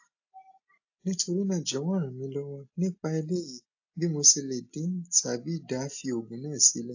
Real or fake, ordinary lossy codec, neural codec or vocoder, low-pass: real; AAC, 48 kbps; none; 7.2 kHz